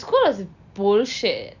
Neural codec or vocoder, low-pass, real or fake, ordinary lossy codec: none; 7.2 kHz; real; none